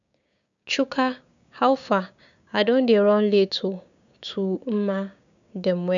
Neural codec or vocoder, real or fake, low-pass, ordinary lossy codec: codec, 16 kHz, 6 kbps, DAC; fake; 7.2 kHz; none